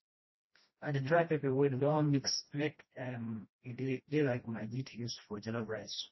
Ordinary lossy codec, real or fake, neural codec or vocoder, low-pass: MP3, 24 kbps; fake; codec, 16 kHz, 1 kbps, FreqCodec, smaller model; 7.2 kHz